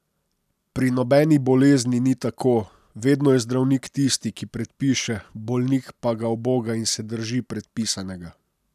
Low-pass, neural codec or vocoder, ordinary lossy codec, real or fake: 14.4 kHz; none; none; real